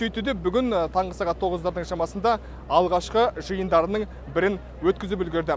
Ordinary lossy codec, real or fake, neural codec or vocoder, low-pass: none; real; none; none